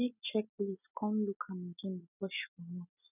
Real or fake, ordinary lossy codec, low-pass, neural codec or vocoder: real; none; 3.6 kHz; none